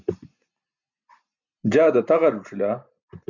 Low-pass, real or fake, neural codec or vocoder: 7.2 kHz; real; none